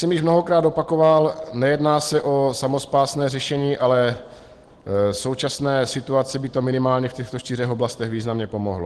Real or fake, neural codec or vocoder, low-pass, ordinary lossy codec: real; none; 10.8 kHz; Opus, 16 kbps